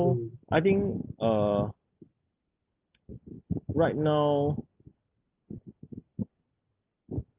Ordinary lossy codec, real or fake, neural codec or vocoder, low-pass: Opus, 24 kbps; real; none; 3.6 kHz